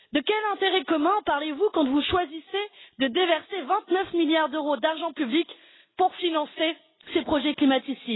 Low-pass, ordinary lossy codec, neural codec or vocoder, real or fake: 7.2 kHz; AAC, 16 kbps; none; real